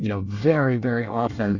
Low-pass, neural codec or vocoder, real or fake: 7.2 kHz; codec, 24 kHz, 1 kbps, SNAC; fake